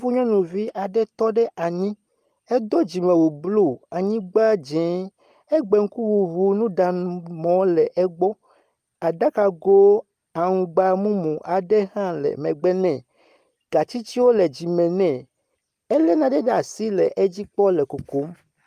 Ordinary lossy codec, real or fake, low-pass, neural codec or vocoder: Opus, 32 kbps; real; 14.4 kHz; none